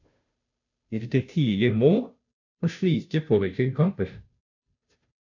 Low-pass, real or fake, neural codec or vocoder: 7.2 kHz; fake; codec, 16 kHz, 0.5 kbps, FunCodec, trained on Chinese and English, 25 frames a second